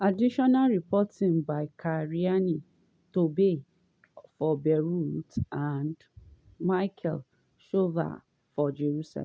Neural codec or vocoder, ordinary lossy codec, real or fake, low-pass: none; none; real; none